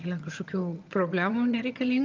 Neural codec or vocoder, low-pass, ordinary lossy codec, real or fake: vocoder, 22.05 kHz, 80 mel bands, HiFi-GAN; 7.2 kHz; Opus, 24 kbps; fake